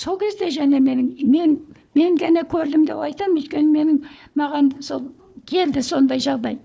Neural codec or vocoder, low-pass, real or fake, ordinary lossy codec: codec, 16 kHz, 4 kbps, FunCodec, trained on Chinese and English, 50 frames a second; none; fake; none